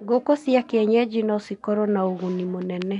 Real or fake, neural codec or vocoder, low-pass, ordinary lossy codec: real; none; 10.8 kHz; none